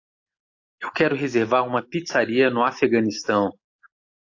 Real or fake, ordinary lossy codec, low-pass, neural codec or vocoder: real; AAC, 48 kbps; 7.2 kHz; none